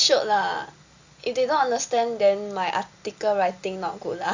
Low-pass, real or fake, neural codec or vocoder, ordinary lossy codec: 7.2 kHz; real; none; Opus, 64 kbps